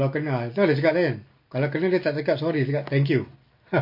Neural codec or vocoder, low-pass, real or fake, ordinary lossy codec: none; 5.4 kHz; real; MP3, 32 kbps